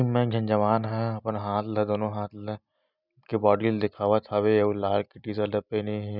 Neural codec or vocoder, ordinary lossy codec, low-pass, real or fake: none; none; 5.4 kHz; real